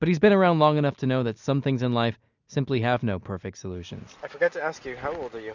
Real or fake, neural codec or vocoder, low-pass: real; none; 7.2 kHz